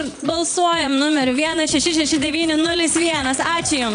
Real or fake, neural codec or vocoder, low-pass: fake; vocoder, 22.05 kHz, 80 mel bands, Vocos; 9.9 kHz